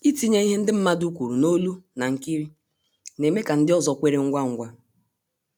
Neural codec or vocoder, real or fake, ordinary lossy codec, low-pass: none; real; none; none